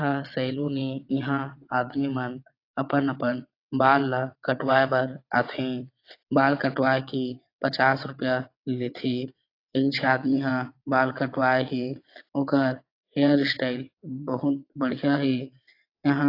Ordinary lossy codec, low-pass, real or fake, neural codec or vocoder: AAC, 32 kbps; 5.4 kHz; fake; vocoder, 22.05 kHz, 80 mel bands, WaveNeXt